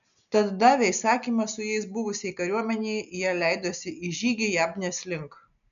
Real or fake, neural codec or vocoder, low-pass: real; none; 7.2 kHz